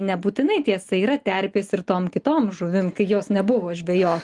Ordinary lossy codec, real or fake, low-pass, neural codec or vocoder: Opus, 24 kbps; real; 10.8 kHz; none